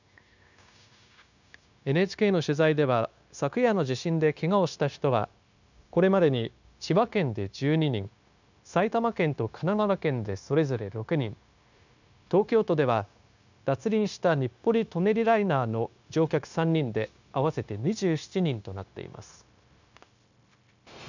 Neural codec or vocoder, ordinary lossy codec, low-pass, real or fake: codec, 16 kHz, 0.9 kbps, LongCat-Audio-Codec; none; 7.2 kHz; fake